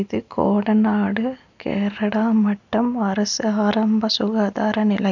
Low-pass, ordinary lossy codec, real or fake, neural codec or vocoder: 7.2 kHz; none; real; none